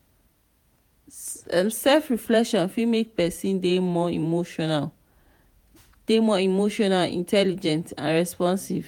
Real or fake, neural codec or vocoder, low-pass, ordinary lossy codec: fake; vocoder, 48 kHz, 128 mel bands, Vocos; 19.8 kHz; MP3, 96 kbps